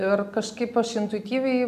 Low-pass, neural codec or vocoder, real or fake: 14.4 kHz; none; real